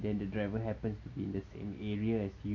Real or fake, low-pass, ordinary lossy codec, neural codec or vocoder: real; 7.2 kHz; none; none